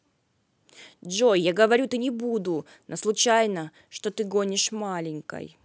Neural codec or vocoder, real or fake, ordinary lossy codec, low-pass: none; real; none; none